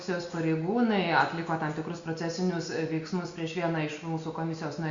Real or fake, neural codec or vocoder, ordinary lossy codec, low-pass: real; none; Opus, 64 kbps; 7.2 kHz